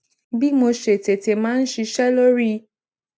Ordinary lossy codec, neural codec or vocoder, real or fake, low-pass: none; none; real; none